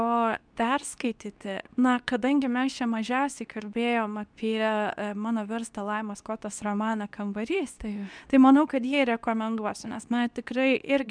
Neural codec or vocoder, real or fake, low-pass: codec, 24 kHz, 0.9 kbps, WavTokenizer, medium speech release version 2; fake; 9.9 kHz